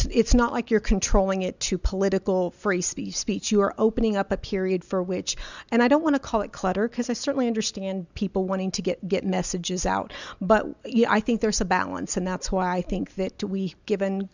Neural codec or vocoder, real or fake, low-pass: none; real; 7.2 kHz